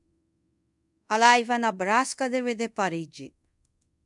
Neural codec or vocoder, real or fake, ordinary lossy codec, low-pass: codec, 24 kHz, 0.5 kbps, DualCodec; fake; MP3, 96 kbps; 10.8 kHz